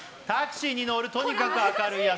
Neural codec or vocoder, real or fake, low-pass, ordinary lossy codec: none; real; none; none